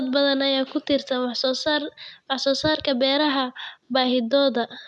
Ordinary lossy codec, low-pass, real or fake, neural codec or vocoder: none; none; real; none